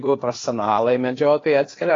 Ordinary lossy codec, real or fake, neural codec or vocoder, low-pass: AAC, 32 kbps; fake; codec, 16 kHz, 0.8 kbps, ZipCodec; 7.2 kHz